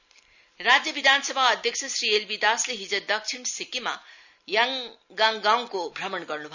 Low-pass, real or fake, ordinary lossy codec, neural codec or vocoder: 7.2 kHz; real; none; none